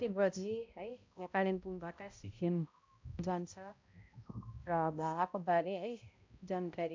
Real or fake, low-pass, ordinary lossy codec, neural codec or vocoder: fake; 7.2 kHz; MP3, 64 kbps; codec, 16 kHz, 0.5 kbps, X-Codec, HuBERT features, trained on balanced general audio